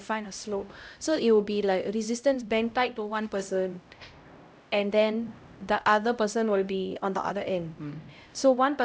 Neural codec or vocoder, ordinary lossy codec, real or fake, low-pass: codec, 16 kHz, 0.5 kbps, X-Codec, HuBERT features, trained on LibriSpeech; none; fake; none